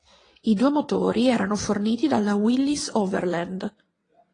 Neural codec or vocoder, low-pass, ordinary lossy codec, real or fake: vocoder, 22.05 kHz, 80 mel bands, WaveNeXt; 9.9 kHz; AAC, 32 kbps; fake